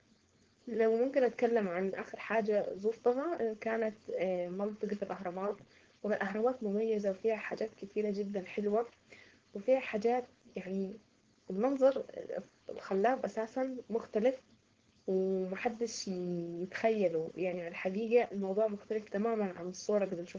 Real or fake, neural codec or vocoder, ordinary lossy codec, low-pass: fake; codec, 16 kHz, 4.8 kbps, FACodec; Opus, 16 kbps; 7.2 kHz